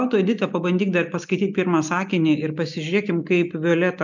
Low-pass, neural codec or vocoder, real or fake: 7.2 kHz; none; real